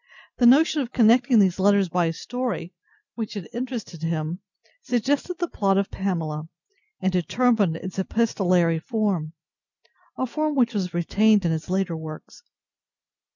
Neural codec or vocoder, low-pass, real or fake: none; 7.2 kHz; real